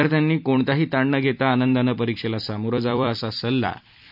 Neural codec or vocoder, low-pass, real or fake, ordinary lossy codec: vocoder, 44.1 kHz, 128 mel bands every 256 samples, BigVGAN v2; 5.4 kHz; fake; none